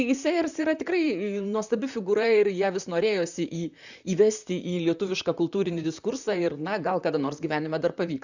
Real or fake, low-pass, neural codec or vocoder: fake; 7.2 kHz; vocoder, 44.1 kHz, 128 mel bands, Pupu-Vocoder